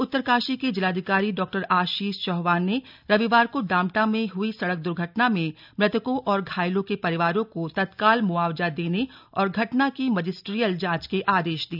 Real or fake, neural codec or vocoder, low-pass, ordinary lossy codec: real; none; 5.4 kHz; none